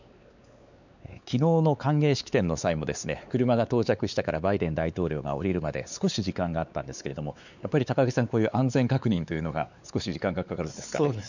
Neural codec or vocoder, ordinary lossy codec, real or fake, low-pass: codec, 16 kHz, 4 kbps, X-Codec, WavLM features, trained on Multilingual LibriSpeech; none; fake; 7.2 kHz